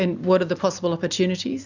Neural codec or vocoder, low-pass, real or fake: none; 7.2 kHz; real